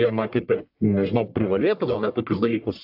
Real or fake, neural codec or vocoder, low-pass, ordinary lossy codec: fake; codec, 44.1 kHz, 1.7 kbps, Pupu-Codec; 5.4 kHz; AAC, 48 kbps